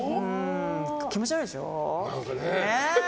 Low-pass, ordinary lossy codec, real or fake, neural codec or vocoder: none; none; real; none